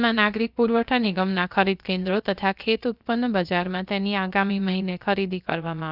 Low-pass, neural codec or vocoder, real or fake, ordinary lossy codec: 5.4 kHz; codec, 16 kHz, about 1 kbps, DyCAST, with the encoder's durations; fake; none